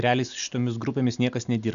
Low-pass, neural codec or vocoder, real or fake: 7.2 kHz; none; real